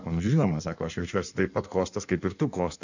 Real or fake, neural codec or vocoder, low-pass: fake; codec, 16 kHz in and 24 kHz out, 1.1 kbps, FireRedTTS-2 codec; 7.2 kHz